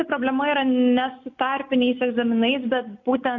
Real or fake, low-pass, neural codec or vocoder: real; 7.2 kHz; none